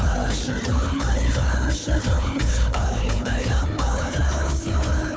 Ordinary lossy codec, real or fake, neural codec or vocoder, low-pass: none; fake; codec, 16 kHz, 4 kbps, FunCodec, trained on Chinese and English, 50 frames a second; none